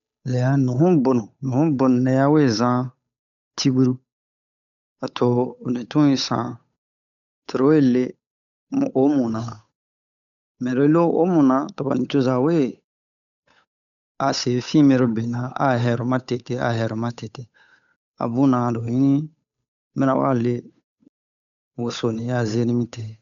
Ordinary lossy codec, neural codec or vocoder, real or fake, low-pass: none; codec, 16 kHz, 8 kbps, FunCodec, trained on Chinese and English, 25 frames a second; fake; 7.2 kHz